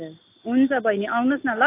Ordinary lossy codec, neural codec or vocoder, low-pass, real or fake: AAC, 32 kbps; none; 3.6 kHz; real